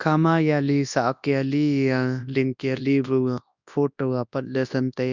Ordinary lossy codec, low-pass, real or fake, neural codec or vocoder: none; 7.2 kHz; fake; codec, 24 kHz, 0.9 kbps, WavTokenizer, large speech release